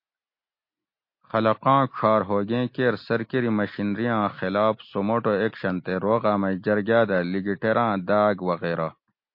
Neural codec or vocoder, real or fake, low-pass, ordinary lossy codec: none; real; 5.4 kHz; MP3, 32 kbps